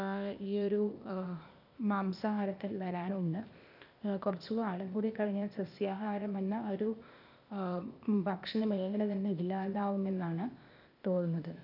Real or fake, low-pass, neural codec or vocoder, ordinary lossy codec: fake; 5.4 kHz; codec, 16 kHz, 0.8 kbps, ZipCodec; none